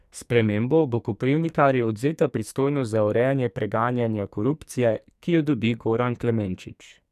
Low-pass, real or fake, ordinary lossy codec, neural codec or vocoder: 14.4 kHz; fake; none; codec, 44.1 kHz, 2.6 kbps, SNAC